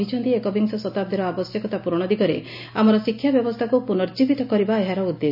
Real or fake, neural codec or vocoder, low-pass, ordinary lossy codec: real; none; 5.4 kHz; none